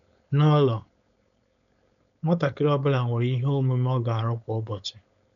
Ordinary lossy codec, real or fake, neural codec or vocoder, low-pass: none; fake; codec, 16 kHz, 4.8 kbps, FACodec; 7.2 kHz